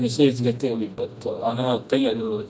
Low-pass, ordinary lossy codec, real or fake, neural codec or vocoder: none; none; fake; codec, 16 kHz, 1 kbps, FreqCodec, smaller model